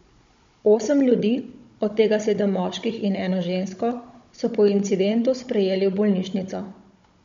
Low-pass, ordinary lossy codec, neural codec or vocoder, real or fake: 7.2 kHz; MP3, 48 kbps; codec, 16 kHz, 16 kbps, FunCodec, trained on Chinese and English, 50 frames a second; fake